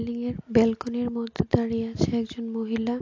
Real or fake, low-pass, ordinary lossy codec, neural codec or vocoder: real; 7.2 kHz; AAC, 48 kbps; none